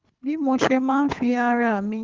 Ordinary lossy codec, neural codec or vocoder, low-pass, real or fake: Opus, 32 kbps; codec, 24 kHz, 3 kbps, HILCodec; 7.2 kHz; fake